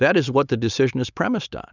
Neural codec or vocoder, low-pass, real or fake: none; 7.2 kHz; real